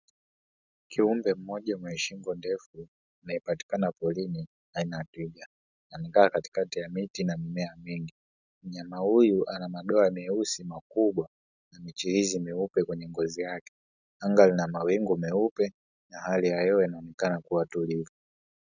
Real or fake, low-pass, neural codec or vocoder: real; 7.2 kHz; none